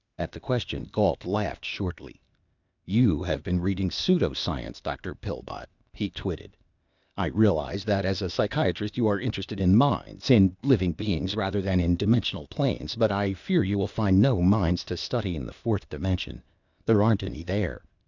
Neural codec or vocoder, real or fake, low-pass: codec, 16 kHz, 0.8 kbps, ZipCodec; fake; 7.2 kHz